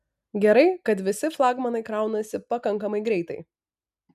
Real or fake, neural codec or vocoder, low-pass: real; none; 14.4 kHz